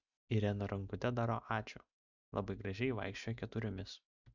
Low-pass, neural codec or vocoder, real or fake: 7.2 kHz; none; real